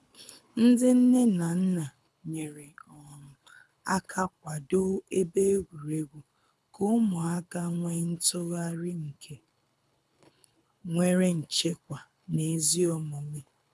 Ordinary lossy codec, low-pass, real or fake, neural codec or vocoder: none; none; fake; codec, 24 kHz, 6 kbps, HILCodec